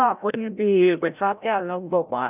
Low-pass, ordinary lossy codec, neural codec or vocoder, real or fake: 3.6 kHz; none; codec, 16 kHz, 0.5 kbps, FreqCodec, larger model; fake